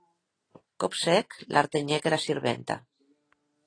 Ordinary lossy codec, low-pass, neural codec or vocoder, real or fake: AAC, 32 kbps; 9.9 kHz; none; real